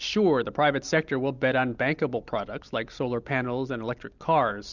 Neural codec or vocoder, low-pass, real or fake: none; 7.2 kHz; real